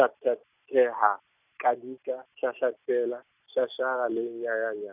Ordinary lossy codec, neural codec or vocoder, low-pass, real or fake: none; none; 3.6 kHz; real